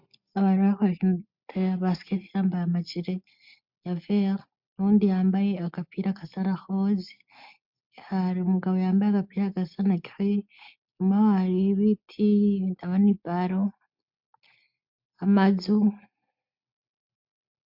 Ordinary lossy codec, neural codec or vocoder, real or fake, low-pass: MP3, 48 kbps; none; real; 5.4 kHz